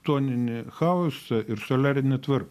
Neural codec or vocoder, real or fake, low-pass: none; real; 14.4 kHz